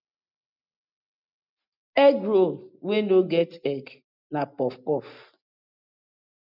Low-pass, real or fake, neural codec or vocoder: 5.4 kHz; real; none